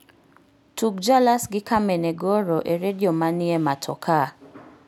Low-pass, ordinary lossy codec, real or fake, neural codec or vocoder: 19.8 kHz; none; real; none